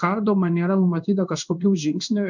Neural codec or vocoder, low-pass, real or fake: codec, 16 kHz in and 24 kHz out, 1 kbps, XY-Tokenizer; 7.2 kHz; fake